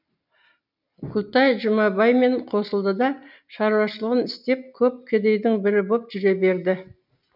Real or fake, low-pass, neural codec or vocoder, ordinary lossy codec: real; 5.4 kHz; none; none